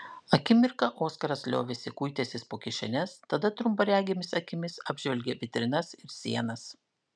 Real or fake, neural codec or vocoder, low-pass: real; none; 9.9 kHz